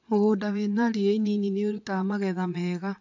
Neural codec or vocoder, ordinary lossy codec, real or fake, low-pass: vocoder, 22.05 kHz, 80 mel bands, Vocos; AAC, 48 kbps; fake; 7.2 kHz